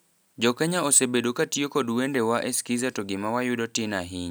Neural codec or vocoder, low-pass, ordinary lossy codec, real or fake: none; none; none; real